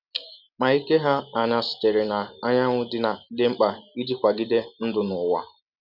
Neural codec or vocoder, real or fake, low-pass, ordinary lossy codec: none; real; 5.4 kHz; none